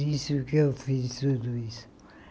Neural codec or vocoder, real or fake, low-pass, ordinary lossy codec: none; real; none; none